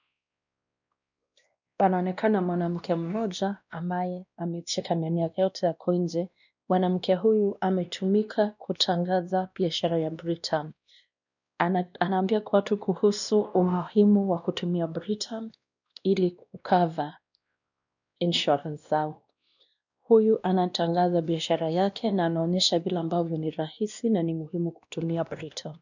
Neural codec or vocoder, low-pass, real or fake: codec, 16 kHz, 1 kbps, X-Codec, WavLM features, trained on Multilingual LibriSpeech; 7.2 kHz; fake